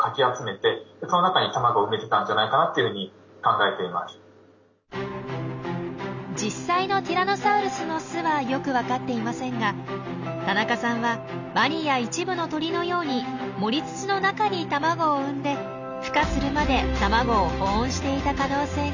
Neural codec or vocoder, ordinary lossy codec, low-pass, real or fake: none; none; 7.2 kHz; real